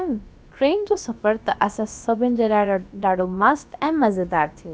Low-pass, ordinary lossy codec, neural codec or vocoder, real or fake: none; none; codec, 16 kHz, about 1 kbps, DyCAST, with the encoder's durations; fake